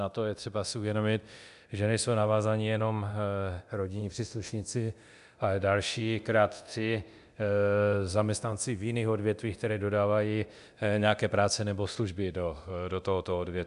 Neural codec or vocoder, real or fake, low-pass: codec, 24 kHz, 0.9 kbps, DualCodec; fake; 10.8 kHz